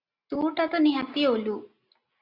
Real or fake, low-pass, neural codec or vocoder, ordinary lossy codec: real; 5.4 kHz; none; Opus, 64 kbps